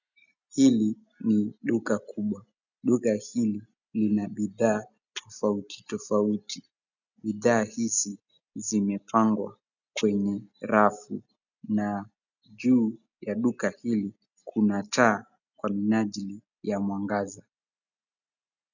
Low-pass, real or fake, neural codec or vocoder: 7.2 kHz; real; none